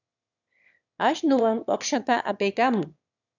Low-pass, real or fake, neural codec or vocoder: 7.2 kHz; fake; autoencoder, 22.05 kHz, a latent of 192 numbers a frame, VITS, trained on one speaker